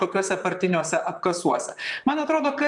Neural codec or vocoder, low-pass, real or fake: vocoder, 44.1 kHz, 128 mel bands, Pupu-Vocoder; 10.8 kHz; fake